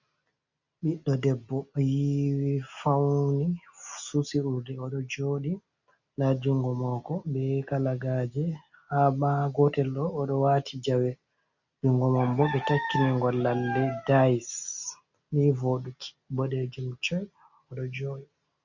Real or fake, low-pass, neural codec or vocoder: real; 7.2 kHz; none